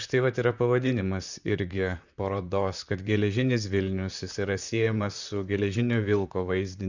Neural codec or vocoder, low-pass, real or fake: vocoder, 44.1 kHz, 128 mel bands, Pupu-Vocoder; 7.2 kHz; fake